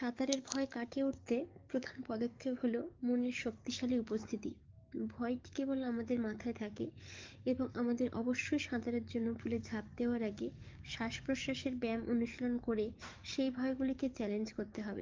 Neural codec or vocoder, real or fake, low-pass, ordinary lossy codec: codec, 44.1 kHz, 7.8 kbps, DAC; fake; 7.2 kHz; Opus, 32 kbps